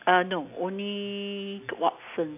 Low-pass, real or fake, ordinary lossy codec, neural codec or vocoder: 3.6 kHz; real; AAC, 32 kbps; none